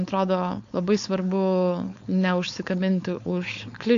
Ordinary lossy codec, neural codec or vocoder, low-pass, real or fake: AAC, 64 kbps; codec, 16 kHz, 4.8 kbps, FACodec; 7.2 kHz; fake